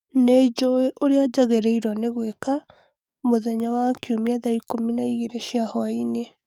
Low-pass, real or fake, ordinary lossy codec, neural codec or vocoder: 19.8 kHz; fake; none; codec, 44.1 kHz, 7.8 kbps, DAC